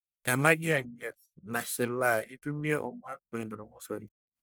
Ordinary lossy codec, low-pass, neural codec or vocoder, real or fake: none; none; codec, 44.1 kHz, 1.7 kbps, Pupu-Codec; fake